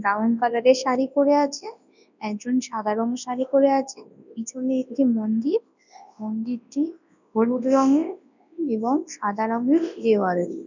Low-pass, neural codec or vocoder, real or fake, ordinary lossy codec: 7.2 kHz; codec, 24 kHz, 0.9 kbps, WavTokenizer, large speech release; fake; none